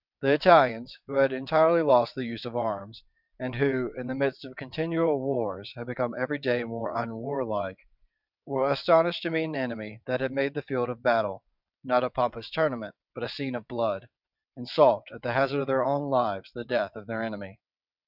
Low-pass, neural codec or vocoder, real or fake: 5.4 kHz; vocoder, 22.05 kHz, 80 mel bands, WaveNeXt; fake